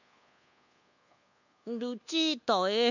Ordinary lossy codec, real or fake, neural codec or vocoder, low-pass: none; fake; codec, 24 kHz, 1.2 kbps, DualCodec; 7.2 kHz